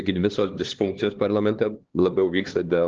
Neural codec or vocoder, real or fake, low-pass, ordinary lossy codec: codec, 16 kHz, 2 kbps, X-Codec, HuBERT features, trained on LibriSpeech; fake; 7.2 kHz; Opus, 16 kbps